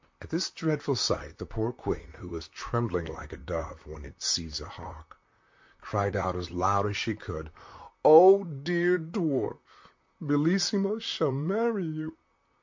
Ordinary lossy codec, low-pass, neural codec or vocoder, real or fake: MP3, 48 kbps; 7.2 kHz; vocoder, 44.1 kHz, 128 mel bands, Pupu-Vocoder; fake